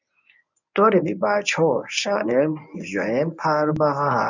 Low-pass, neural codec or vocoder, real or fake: 7.2 kHz; codec, 24 kHz, 0.9 kbps, WavTokenizer, medium speech release version 1; fake